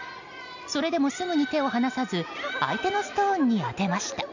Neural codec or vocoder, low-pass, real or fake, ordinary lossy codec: none; 7.2 kHz; real; none